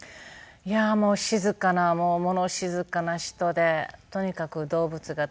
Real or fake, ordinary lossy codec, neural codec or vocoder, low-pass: real; none; none; none